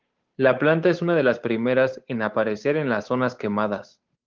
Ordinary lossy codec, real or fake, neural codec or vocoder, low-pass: Opus, 32 kbps; fake; codec, 16 kHz, 8 kbps, FunCodec, trained on Chinese and English, 25 frames a second; 7.2 kHz